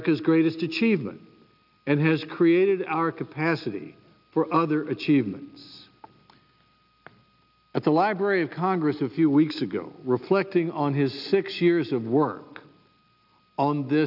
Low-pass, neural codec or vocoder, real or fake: 5.4 kHz; none; real